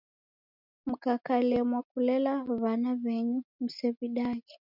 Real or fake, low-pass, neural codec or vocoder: real; 5.4 kHz; none